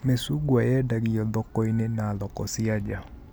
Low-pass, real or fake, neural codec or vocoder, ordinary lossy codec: none; real; none; none